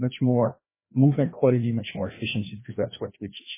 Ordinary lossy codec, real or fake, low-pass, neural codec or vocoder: MP3, 16 kbps; fake; 3.6 kHz; codec, 16 kHz, 1 kbps, FreqCodec, larger model